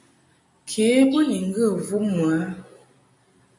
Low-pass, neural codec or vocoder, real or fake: 10.8 kHz; none; real